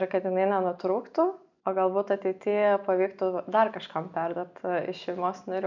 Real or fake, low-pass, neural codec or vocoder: real; 7.2 kHz; none